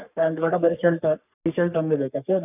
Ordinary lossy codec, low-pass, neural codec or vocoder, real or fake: none; 3.6 kHz; codec, 44.1 kHz, 3.4 kbps, Pupu-Codec; fake